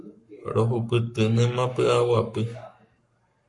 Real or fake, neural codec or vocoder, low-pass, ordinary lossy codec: fake; codec, 44.1 kHz, 7.8 kbps, Pupu-Codec; 10.8 kHz; MP3, 48 kbps